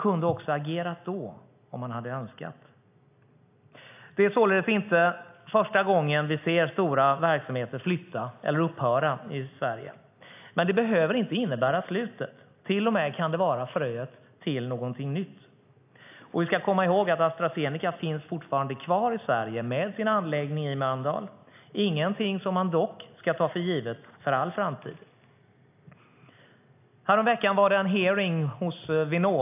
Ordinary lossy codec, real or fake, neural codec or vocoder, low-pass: AAC, 32 kbps; real; none; 3.6 kHz